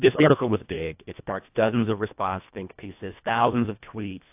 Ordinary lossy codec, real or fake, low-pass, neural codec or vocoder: AAC, 32 kbps; fake; 3.6 kHz; codec, 24 kHz, 1.5 kbps, HILCodec